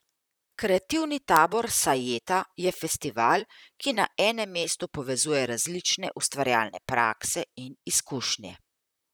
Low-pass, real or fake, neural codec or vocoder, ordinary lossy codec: none; fake; vocoder, 44.1 kHz, 128 mel bands, Pupu-Vocoder; none